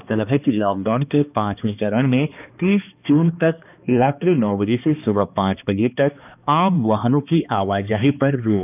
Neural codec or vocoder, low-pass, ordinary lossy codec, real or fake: codec, 16 kHz, 2 kbps, X-Codec, HuBERT features, trained on balanced general audio; 3.6 kHz; none; fake